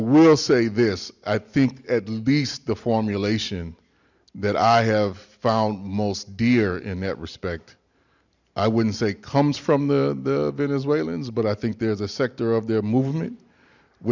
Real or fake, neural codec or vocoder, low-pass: real; none; 7.2 kHz